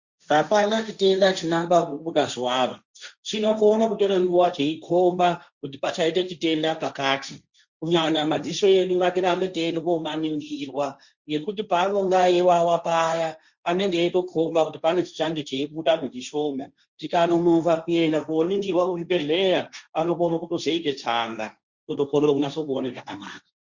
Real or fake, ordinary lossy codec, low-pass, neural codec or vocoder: fake; Opus, 64 kbps; 7.2 kHz; codec, 16 kHz, 1.1 kbps, Voila-Tokenizer